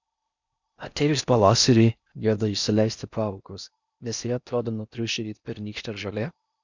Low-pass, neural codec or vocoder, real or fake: 7.2 kHz; codec, 16 kHz in and 24 kHz out, 0.6 kbps, FocalCodec, streaming, 4096 codes; fake